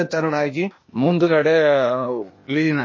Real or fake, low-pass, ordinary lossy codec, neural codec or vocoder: fake; 7.2 kHz; MP3, 32 kbps; codec, 16 kHz, 0.8 kbps, ZipCodec